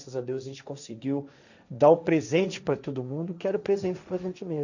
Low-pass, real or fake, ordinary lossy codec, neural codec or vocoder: 7.2 kHz; fake; none; codec, 16 kHz, 1.1 kbps, Voila-Tokenizer